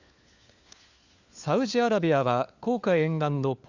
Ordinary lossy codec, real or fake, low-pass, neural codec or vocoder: Opus, 64 kbps; fake; 7.2 kHz; codec, 16 kHz, 4 kbps, FunCodec, trained on LibriTTS, 50 frames a second